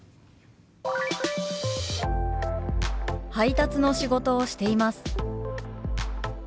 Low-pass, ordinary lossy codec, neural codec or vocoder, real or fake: none; none; none; real